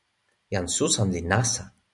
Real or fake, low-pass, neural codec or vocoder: real; 10.8 kHz; none